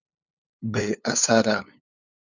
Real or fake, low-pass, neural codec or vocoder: fake; 7.2 kHz; codec, 16 kHz, 8 kbps, FunCodec, trained on LibriTTS, 25 frames a second